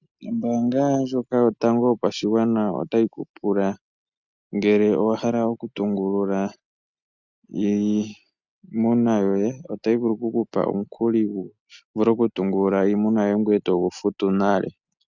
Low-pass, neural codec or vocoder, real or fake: 7.2 kHz; none; real